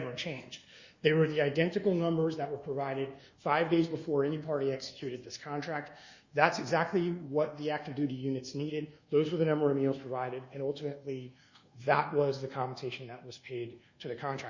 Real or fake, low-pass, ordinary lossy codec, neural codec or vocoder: fake; 7.2 kHz; Opus, 64 kbps; codec, 24 kHz, 1.2 kbps, DualCodec